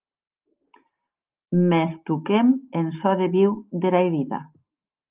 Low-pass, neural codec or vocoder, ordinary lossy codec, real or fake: 3.6 kHz; none; Opus, 24 kbps; real